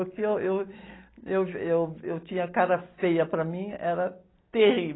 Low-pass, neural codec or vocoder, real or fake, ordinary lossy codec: 7.2 kHz; none; real; AAC, 16 kbps